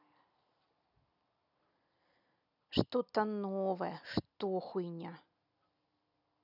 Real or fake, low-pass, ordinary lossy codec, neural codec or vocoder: real; 5.4 kHz; none; none